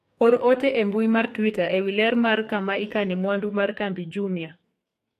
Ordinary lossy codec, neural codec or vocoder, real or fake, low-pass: AAC, 64 kbps; codec, 44.1 kHz, 2.6 kbps, SNAC; fake; 14.4 kHz